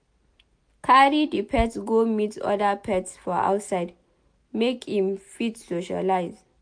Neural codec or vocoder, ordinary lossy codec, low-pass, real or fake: none; MP3, 64 kbps; 9.9 kHz; real